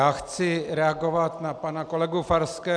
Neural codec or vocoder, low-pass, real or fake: none; 9.9 kHz; real